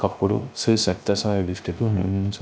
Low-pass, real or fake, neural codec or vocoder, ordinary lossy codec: none; fake; codec, 16 kHz, 0.3 kbps, FocalCodec; none